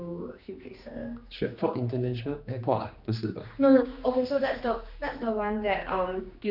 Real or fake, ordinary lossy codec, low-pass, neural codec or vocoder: fake; none; 5.4 kHz; codec, 16 kHz, 2 kbps, X-Codec, HuBERT features, trained on general audio